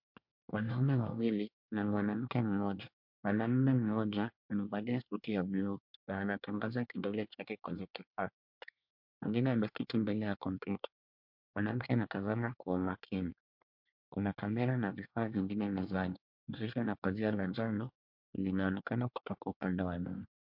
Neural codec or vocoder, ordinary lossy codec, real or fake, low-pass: codec, 24 kHz, 1 kbps, SNAC; MP3, 48 kbps; fake; 5.4 kHz